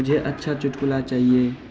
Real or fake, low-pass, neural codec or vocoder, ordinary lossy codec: real; none; none; none